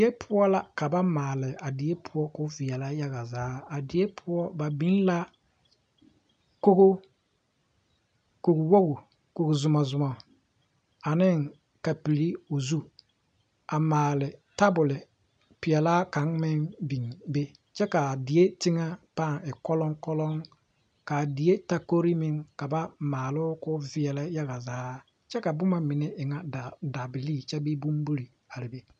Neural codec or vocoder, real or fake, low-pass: none; real; 9.9 kHz